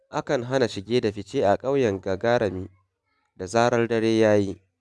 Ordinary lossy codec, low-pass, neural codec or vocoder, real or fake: none; none; none; real